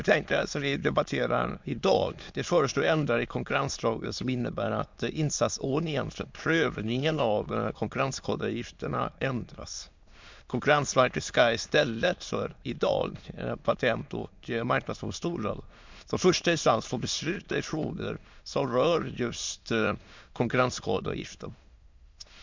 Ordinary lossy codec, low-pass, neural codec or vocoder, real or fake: MP3, 64 kbps; 7.2 kHz; autoencoder, 22.05 kHz, a latent of 192 numbers a frame, VITS, trained on many speakers; fake